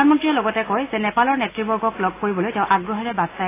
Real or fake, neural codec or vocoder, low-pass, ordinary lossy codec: real; none; 3.6 kHz; AAC, 16 kbps